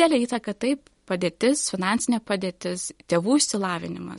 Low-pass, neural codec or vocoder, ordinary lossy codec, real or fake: 19.8 kHz; none; MP3, 48 kbps; real